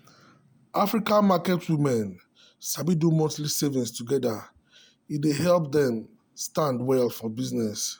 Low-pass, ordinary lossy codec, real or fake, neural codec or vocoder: none; none; real; none